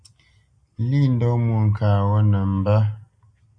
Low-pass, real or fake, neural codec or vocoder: 9.9 kHz; real; none